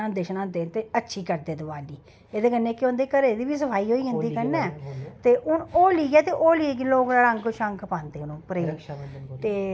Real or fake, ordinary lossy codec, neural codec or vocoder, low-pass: real; none; none; none